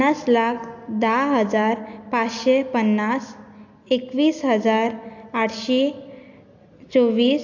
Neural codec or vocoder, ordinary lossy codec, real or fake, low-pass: none; AAC, 48 kbps; real; 7.2 kHz